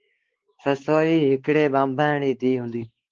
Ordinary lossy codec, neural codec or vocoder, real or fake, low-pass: Opus, 16 kbps; codec, 16 kHz, 4 kbps, X-Codec, WavLM features, trained on Multilingual LibriSpeech; fake; 7.2 kHz